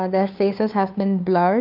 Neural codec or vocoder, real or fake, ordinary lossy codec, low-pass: codec, 16 kHz, 2 kbps, FunCodec, trained on Chinese and English, 25 frames a second; fake; AAC, 48 kbps; 5.4 kHz